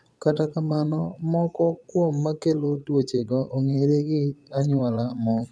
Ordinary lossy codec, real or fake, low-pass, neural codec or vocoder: none; fake; none; vocoder, 22.05 kHz, 80 mel bands, Vocos